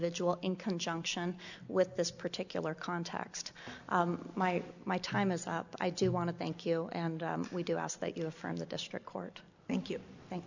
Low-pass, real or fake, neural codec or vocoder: 7.2 kHz; real; none